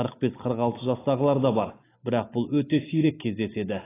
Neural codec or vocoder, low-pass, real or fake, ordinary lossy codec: none; 3.6 kHz; real; AAC, 16 kbps